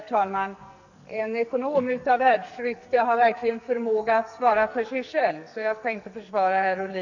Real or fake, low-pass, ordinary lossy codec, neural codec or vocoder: fake; 7.2 kHz; none; codec, 44.1 kHz, 2.6 kbps, SNAC